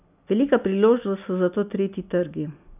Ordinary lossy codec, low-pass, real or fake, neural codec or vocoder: none; 3.6 kHz; real; none